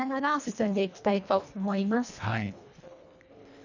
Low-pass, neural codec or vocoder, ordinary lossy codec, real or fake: 7.2 kHz; codec, 24 kHz, 1.5 kbps, HILCodec; none; fake